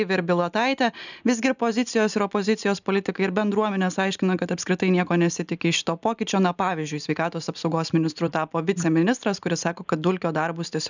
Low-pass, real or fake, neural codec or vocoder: 7.2 kHz; real; none